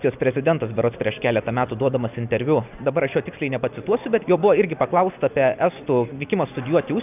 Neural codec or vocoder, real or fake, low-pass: none; real; 3.6 kHz